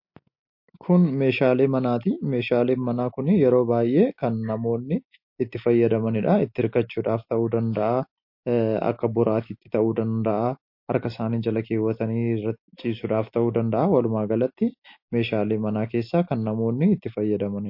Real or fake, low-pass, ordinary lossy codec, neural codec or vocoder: real; 5.4 kHz; MP3, 32 kbps; none